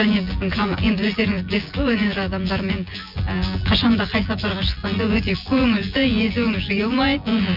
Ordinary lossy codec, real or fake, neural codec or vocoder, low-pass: MP3, 48 kbps; fake; vocoder, 24 kHz, 100 mel bands, Vocos; 5.4 kHz